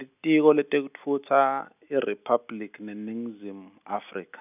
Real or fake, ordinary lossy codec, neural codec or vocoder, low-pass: real; none; none; 3.6 kHz